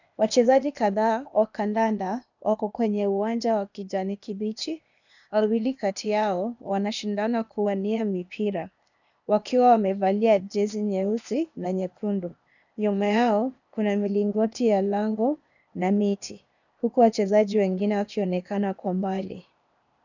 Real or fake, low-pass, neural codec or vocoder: fake; 7.2 kHz; codec, 16 kHz, 0.8 kbps, ZipCodec